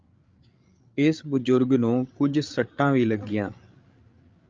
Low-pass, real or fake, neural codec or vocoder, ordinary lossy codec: 7.2 kHz; fake; codec, 16 kHz, 8 kbps, FreqCodec, larger model; Opus, 24 kbps